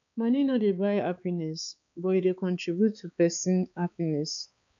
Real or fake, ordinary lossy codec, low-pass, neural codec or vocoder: fake; none; 7.2 kHz; codec, 16 kHz, 4 kbps, X-Codec, HuBERT features, trained on balanced general audio